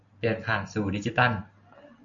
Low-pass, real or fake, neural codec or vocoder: 7.2 kHz; real; none